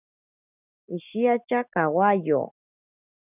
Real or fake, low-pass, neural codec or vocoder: real; 3.6 kHz; none